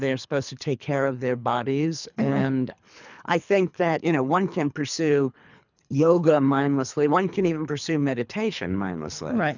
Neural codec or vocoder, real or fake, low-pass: codec, 24 kHz, 3 kbps, HILCodec; fake; 7.2 kHz